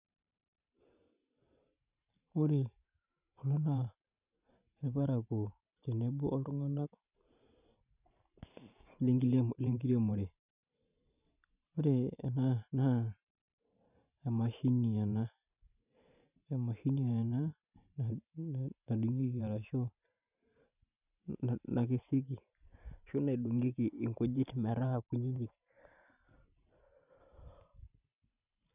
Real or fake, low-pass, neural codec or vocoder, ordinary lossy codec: fake; 3.6 kHz; vocoder, 44.1 kHz, 128 mel bands every 512 samples, BigVGAN v2; none